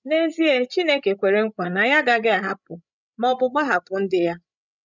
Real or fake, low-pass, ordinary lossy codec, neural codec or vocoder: fake; 7.2 kHz; none; codec, 16 kHz, 16 kbps, FreqCodec, larger model